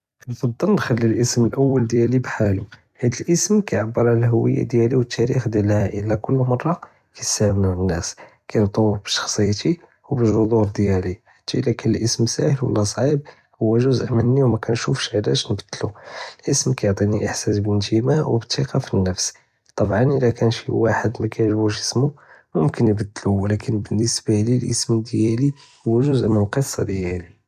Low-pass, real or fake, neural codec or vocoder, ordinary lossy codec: 14.4 kHz; fake; vocoder, 44.1 kHz, 128 mel bands every 256 samples, BigVGAN v2; none